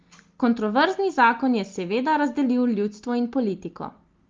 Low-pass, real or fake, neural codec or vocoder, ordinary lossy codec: 7.2 kHz; real; none; Opus, 32 kbps